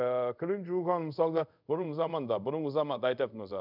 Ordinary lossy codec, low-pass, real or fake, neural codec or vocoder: none; 5.4 kHz; fake; codec, 24 kHz, 0.5 kbps, DualCodec